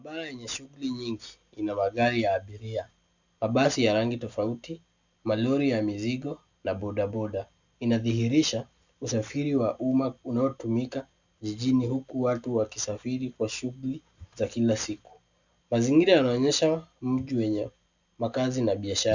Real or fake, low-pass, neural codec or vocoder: real; 7.2 kHz; none